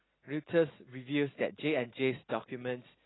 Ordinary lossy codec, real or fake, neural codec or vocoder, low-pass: AAC, 16 kbps; real; none; 7.2 kHz